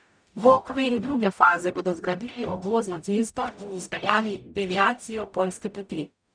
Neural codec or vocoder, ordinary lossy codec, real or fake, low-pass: codec, 44.1 kHz, 0.9 kbps, DAC; none; fake; 9.9 kHz